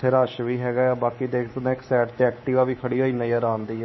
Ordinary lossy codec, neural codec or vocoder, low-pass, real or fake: MP3, 24 kbps; codec, 24 kHz, 3.1 kbps, DualCodec; 7.2 kHz; fake